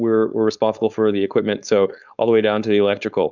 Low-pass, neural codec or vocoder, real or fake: 7.2 kHz; codec, 16 kHz, 4.8 kbps, FACodec; fake